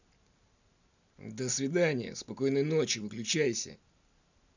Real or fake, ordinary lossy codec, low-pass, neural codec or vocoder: real; none; 7.2 kHz; none